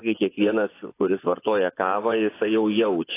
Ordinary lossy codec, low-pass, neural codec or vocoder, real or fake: AAC, 24 kbps; 3.6 kHz; vocoder, 24 kHz, 100 mel bands, Vocos; fake